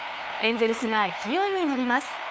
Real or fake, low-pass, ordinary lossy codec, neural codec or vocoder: fake; none; none; codec, 16 kHz, 2 kbps, FunCodec, trained on LibriTTS, 25 frames a second